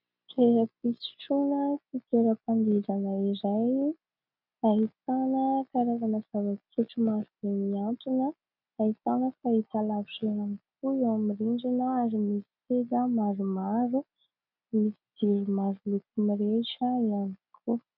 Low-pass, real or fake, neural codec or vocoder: 5.4 kHz; real; none